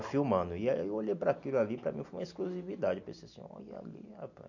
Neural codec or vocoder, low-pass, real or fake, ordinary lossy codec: none; 7.2 kHz; real; none